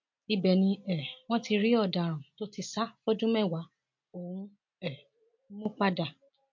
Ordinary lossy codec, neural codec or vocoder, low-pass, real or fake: MP3, 48 kbps; none; 7.2 kHz; real